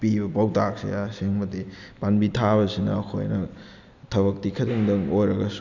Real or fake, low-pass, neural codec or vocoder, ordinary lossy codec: real; 7.2 kHz; none; none